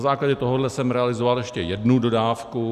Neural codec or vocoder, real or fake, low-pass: none; real; 14.4 kHz